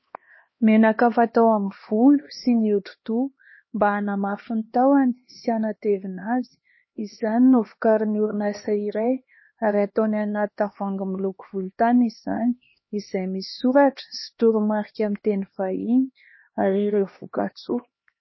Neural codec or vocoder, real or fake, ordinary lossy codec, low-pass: codec, 16 kHz, 2 kbps, X-Codec, HuBERT features, trained on LibriSpeech; fake; MP3, 24 kbps; 7.2 kHz